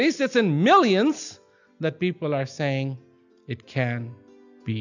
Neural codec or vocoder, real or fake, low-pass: none; real; 7.2 kHz